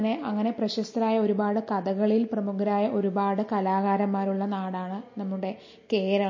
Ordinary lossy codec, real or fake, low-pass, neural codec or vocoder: MP3, 32 kbps; real; 7.2 kHz; none